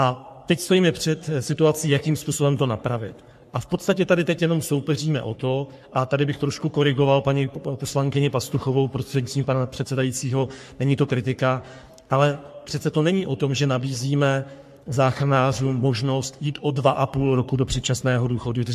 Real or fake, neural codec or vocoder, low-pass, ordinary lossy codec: fake; codec, 44.1 kHz, 3.4 kbps, Pupu-Codec; 14.4 kHz; MP3, 64 kbps